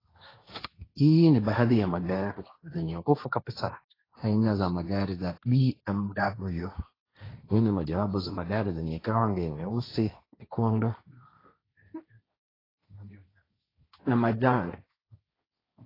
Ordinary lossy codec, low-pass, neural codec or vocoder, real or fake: AAC, 24 kbps; 5.4 kHz; codec, 16 kHz, 1.1 kbps, Voila-Tokenizer; fake